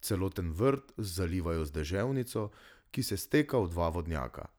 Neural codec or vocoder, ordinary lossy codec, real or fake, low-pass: none; none; real; none